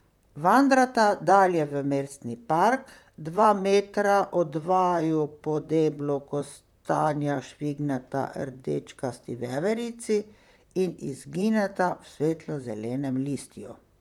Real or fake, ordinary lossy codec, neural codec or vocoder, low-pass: fake; none; vocoder, 44.1 kHz, 128 mel bands, Pupu-Vocoder; 19.8 kHz